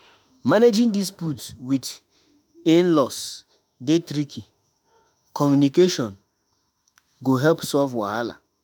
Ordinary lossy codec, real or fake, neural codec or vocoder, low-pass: none; fake; autoencoder, 48 kHz, 32 numbers a frame, DAC-VAE, trained on Japanese speech; none